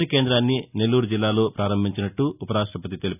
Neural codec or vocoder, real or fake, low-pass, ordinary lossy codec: none; real; 3.6 kHz; none